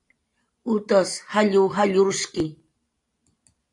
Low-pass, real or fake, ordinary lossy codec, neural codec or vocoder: 10.8 kHz; real; AAC, 48 kbps; none